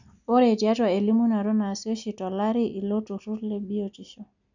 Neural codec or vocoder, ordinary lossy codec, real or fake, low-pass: none; none; real; 7.2 kHz